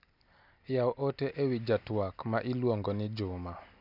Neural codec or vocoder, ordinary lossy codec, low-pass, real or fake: none; none; 5.4 kHz; real